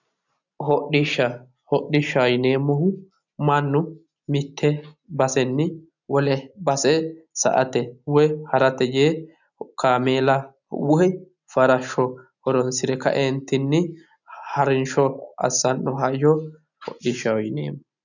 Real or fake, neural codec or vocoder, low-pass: real; none; 7.2 kHz